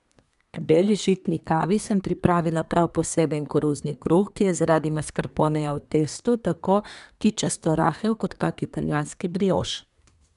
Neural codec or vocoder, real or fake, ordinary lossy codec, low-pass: codec, 24 kHz, 1 kbps, SNAC; fake; none; 10.8 kHz